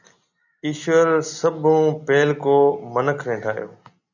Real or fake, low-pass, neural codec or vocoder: real; 7.2 kHz; none